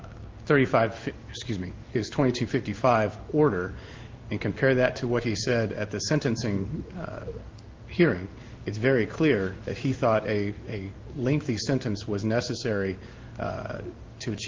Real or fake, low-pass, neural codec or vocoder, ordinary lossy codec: fake; 7.2 kHz; codec, 16 kHz in and 24 kHz out, 1 kbps, XY-Tokenizer; Opus, 24 kbps